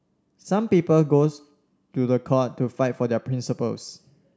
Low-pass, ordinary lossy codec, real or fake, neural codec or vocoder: none; none; real; none